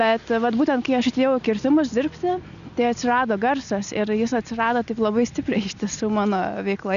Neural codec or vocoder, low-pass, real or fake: none; 7.2 kHz; real